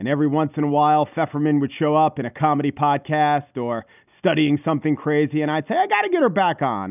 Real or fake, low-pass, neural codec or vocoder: real; 3.6 kHz; none